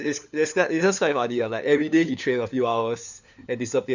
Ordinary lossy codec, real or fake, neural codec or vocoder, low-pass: none; fake; codec, 16 kHz, 4 kbps, FunCodec, trained on LibriTTS, 50 frames a second; 7.2 kHz